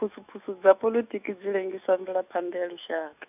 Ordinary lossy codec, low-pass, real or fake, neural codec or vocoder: none; 3.6 kHz; fake; autoencoder, 48 kHz, 128 numbers a frame, DAC-VAE, trained on Japanese speech